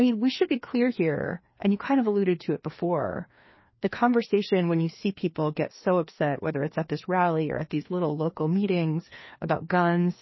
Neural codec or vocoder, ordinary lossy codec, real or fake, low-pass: codec, 16 kHz, 2 kbps, FreqCodec, larger model; MP3, 24 kbps; fake; 7.2 kHz